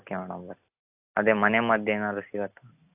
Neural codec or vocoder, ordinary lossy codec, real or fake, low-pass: none; AAC, 32 kbps; real; 3.6 kHz